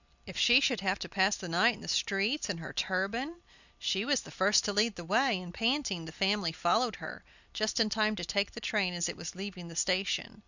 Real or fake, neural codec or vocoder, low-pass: real; none; 7.2 kHz